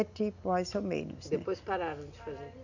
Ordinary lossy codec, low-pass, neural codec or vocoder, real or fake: none; 7.2 kHz; none; real